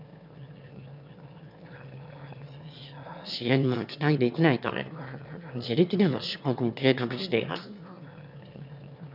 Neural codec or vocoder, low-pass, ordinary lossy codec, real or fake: autoencoder, 22.05 kHz, a latent of 192 numbers a frame, VITS, trained on one speaker; 5.4 kHz; none; fake